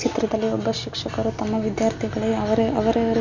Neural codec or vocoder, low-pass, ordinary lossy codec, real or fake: none; 7.2 kHz; MP3, 64 kbps; real